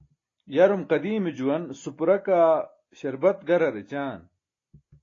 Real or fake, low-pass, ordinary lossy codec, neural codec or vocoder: real; 7.2 kHz; AAC, 32 kbps; none